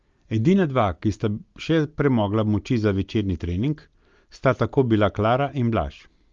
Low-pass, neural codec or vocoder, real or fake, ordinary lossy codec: 7.2 kHz; none; real; Opus, 32 kbps